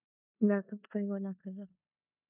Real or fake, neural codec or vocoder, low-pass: fake; codec, 16 kHz in and 24 kHz out, 0.9 kbps, LongCat-Audio-Codec, four codebook decoder; 3.6 kHz